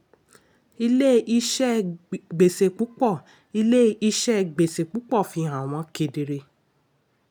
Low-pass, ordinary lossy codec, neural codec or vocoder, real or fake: none; none; none; real